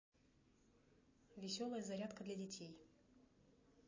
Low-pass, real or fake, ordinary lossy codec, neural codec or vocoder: 7.2 kHz; real; MP3, 32 kbps; none